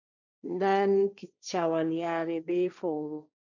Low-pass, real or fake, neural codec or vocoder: 7.2 kHz; fake; codec, 16 kHz, 1.1 kbps, Voila-Tokenizer